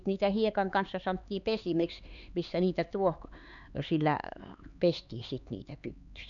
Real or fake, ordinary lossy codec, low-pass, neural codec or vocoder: fake; none; 7.2 kHz; codec, 16 kHz, 4 kbps, X-Codec, HuBERT features, trained on LibriSpeech